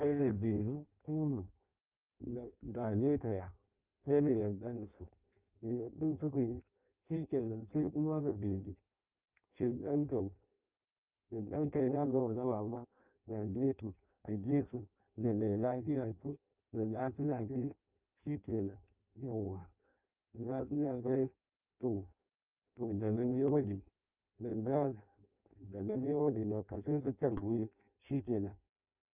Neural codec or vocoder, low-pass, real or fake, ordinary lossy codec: codec, 16 kHz in and 24 kHz out, 0.6 kbps, FireRedTTS-2 codec; 3.6 kHz; fake; Opus, 24 kbps